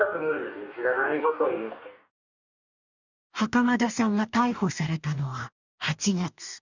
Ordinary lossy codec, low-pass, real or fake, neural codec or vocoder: none; 7.2 kHz; fake; codec, 44.1 kHz, 2.6 kbps, DAC